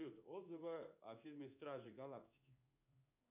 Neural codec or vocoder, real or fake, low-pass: codec, 16 kHz in and 24 kHz out, 1 kbps, XY-Tokenizer; fake; 3.6 kHz